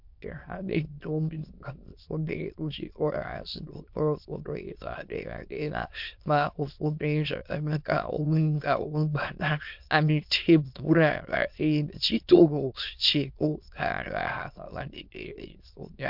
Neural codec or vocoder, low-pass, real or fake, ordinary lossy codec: autoencoder, 22.05 kHz, a latent of 192 numbers a frame, VITS, trained on many speakers; 5.4 kHz; fake; MP3, 48 kbps